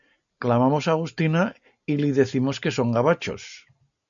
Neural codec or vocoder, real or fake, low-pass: none; real; 7.2 kHz